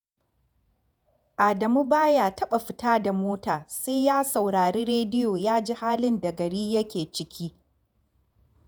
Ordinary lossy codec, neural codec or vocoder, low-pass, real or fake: none; vocoder, 48 kHz, 128 mel bands, Vocos; none; fake